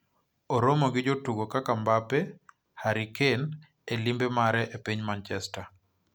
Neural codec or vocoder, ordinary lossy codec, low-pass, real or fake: none; none; none; real